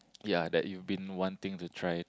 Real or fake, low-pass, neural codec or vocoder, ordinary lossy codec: real; none; none; none